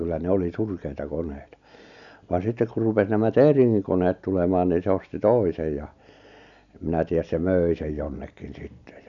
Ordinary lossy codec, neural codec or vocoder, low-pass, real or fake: none; none; 7.2 kHz; real